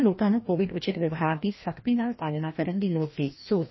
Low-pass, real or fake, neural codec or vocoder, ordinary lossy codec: 7.2 kHz; fake; codec, 16 kHz, 1 kbps, FreqCodec, larger model; MP3, 24 kbps